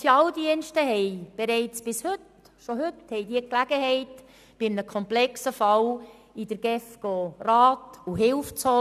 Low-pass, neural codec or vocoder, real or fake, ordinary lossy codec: 14.4 kHz; none; real; none